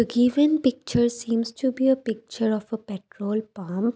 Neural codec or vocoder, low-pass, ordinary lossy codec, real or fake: none; none; none; real